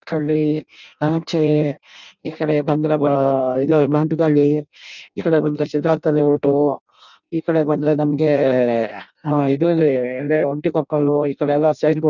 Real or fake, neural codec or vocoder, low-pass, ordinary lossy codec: fake; codec, 16 kHz in and 24 kHz out, 0.6 kbps, FireRedTTS-2 codec; 7.2 kHz; none